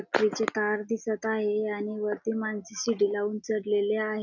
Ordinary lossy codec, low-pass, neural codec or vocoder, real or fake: none; 7.2 kHz; none; real